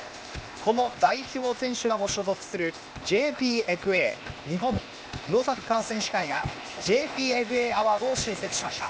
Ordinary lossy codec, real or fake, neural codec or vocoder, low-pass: none; fake; codec, 16 kHz, 0.8 kbps, ZipCodec; none